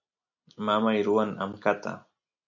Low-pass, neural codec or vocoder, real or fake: 7.2 kHz; none; real